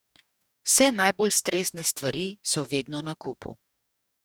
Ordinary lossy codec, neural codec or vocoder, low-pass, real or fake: none; codec, 44.1 kHz, 2.6 kbps, DAC; none; fake